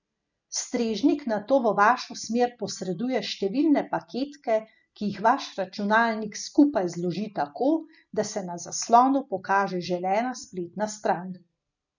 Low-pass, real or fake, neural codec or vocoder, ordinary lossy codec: 7.2 kHz; real; none; none